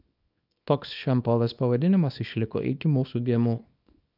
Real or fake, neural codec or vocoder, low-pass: fake; codec, 24 kHz, 0.9 kbps, WavTokenizer, small release; 5.4 kHz